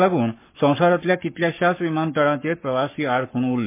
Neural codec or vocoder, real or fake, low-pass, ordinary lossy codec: codec, 44.1 kHz, 7.8 kbps, Pupu-Codec; fake; 3.6 kHz; MP3, 24 kbps